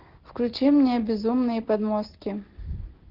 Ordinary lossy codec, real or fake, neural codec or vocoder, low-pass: Opus, 16 kbps; real; none; 5.4 kHz